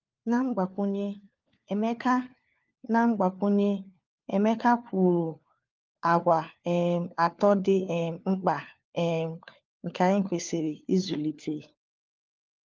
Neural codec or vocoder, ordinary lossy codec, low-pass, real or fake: codec, 16 kHz, 4 kbps, FunCodec, trained on LibriTTS, 50 frames a second; Opus, 32 kbps; 7.2 kHz; fake